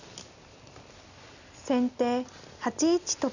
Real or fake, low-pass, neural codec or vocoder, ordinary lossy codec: real; 7.2 kHz; none; none